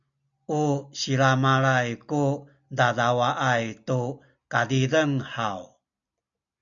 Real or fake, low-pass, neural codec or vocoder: real; 7.2 kHz; none